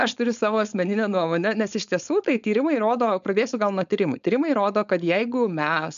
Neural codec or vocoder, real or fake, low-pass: codec, 16 kHz, 4.8 kbps, FACodec; fake; 7.2 kHz